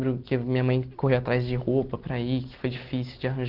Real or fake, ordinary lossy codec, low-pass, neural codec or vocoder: real; Opus, 16 kbps; 5.4 kHz; none